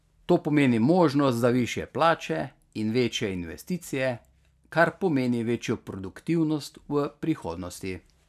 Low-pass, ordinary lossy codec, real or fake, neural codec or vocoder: 14.4 kHz; none; real; none